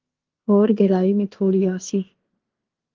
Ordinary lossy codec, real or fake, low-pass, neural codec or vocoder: Opus, 16 kbps; fake; 7.2 kHz; codec, 16 kHz in and 24 kHz out, 0.9 kbps, LongCat-Audio-Codec, fine tuned four codebook decoder